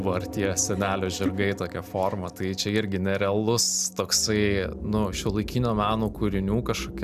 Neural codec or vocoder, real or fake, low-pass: none; real; 14.4 kHz